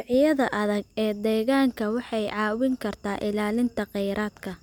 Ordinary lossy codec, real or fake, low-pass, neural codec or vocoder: none; real; 19.8 kHz; none